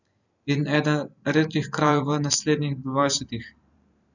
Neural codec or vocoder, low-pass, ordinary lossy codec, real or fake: vocoder, 44.1 kHz, 128 mel bands every 512 samples, BigVGAN v2; 7.2 kHz; none; fake